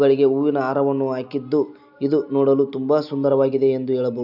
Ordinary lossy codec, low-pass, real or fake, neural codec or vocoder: none; 5.4 kHz; real; none